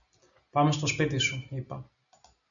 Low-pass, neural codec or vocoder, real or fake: 7.2 kHz; none; real